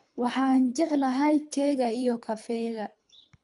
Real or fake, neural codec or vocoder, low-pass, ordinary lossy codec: fake; codec, 24 kHz, 3 kbps, HILCodec; 10.8 kHz; none